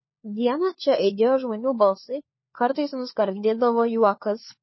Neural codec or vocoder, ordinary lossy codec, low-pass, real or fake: codec, 16 kHz, 4 kbps, FunCodec, trained on LibriTTS, 50 frames a second; MP3, 24 kbps; 7.2 kHz; fake